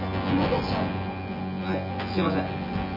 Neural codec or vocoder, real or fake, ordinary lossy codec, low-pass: vocoder, 24 kHz, 100 mel bands, Vocos; fake; MP3, 48 kbps; 5.4 kHz